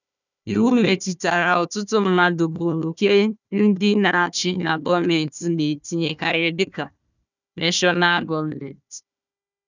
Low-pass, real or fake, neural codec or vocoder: 7.2 kHz; fake; codec, 16 kHz, 1 kbps, FunCodec, trained on Chinese and English, 50 frames a second